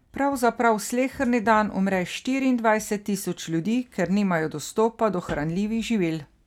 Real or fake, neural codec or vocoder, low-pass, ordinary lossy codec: real; none; 19.8 kHz; none